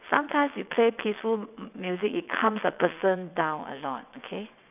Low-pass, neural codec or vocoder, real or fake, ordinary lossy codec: 3.6 kHz; vocoder, 22.05 kHz, 80 mel bands, WaveNeXt; fake; none